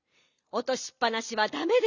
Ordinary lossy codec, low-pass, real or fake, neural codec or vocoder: none; 7.2 kHz; real; none